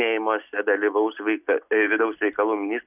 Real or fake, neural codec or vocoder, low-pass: real; none; 3.6 kHz